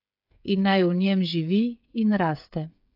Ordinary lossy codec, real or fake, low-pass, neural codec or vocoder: AAC, 48 kbps; fake; 5.4 kHz; codec, 16 kHz, 8 kbps, FreqCodec, smaller model